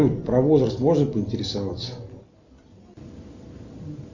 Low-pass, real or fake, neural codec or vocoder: 7.2 kHz; real; none